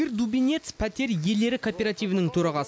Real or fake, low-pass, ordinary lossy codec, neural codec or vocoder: real; none; none; none